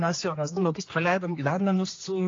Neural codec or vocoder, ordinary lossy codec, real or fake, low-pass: codec, 16 kHz, 2 kbps, X-Codec, HuBERT features, trained on general audio; AAC, 32 kbps; fake; 7.2 kHz